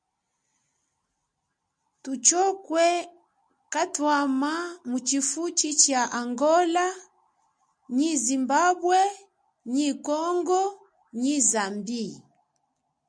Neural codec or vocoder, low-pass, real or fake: none; 9.9 kHz; real